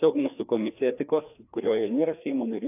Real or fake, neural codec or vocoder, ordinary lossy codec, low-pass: fake; codec, 16 kHz, 2 kbps, FreqCodec, larger model; AAC, 32 kbps; 3.6 kHz